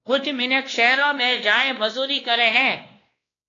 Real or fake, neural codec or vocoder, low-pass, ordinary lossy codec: fake; codec, 16 kHz, 1 kbps, X-Codec, WavLM features, trained on Multilingual LibriSpeech; 7.2 kHz; AAC, 32 kbps